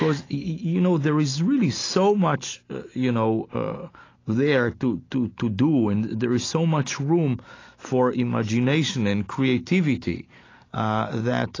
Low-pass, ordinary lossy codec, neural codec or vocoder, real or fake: 7.2 kHz; AAC, 32 kbps; none; real